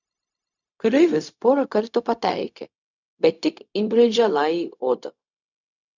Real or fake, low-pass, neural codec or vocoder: fake; 7.2 kHz; codec, 16 kHz, 0.4 kbps, LongCat-Audio-Codec